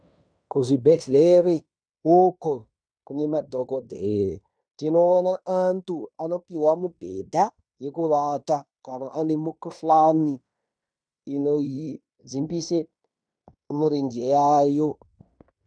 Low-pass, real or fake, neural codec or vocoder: 9.9 kHz; fake; codec, 16 kHz in and 24 kHz out, 0.9 kbps, LongCat-Audio-Codec, fine tuned four codebook decoder